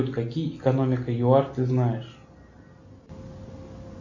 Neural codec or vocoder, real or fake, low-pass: none; real; 7.2 kHz